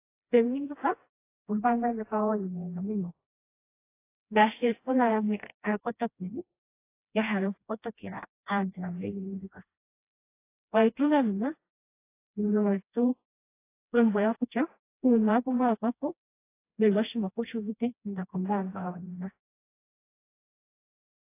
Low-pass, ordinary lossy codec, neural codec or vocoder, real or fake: 3.6 kHz; AAC, 24 kbps; codec, 16 kHz, 1 kbps, FreqCodec, smaller model; fake